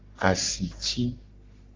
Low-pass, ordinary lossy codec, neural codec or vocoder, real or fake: 7.2 kHz; Opus, 32 kbps; codec, 44.1 kHz, 3.4 kbps, Pupu-Codec; fake